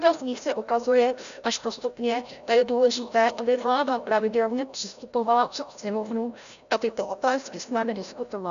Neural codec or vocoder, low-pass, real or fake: codec, 16 kHz, 0.5 kbps, FreqCodec, larger model; 7.2 kHz; fake